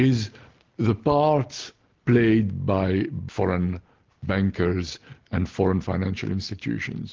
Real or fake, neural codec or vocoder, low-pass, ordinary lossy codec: real; none; 7.2 kHz; Opus, 16 kbps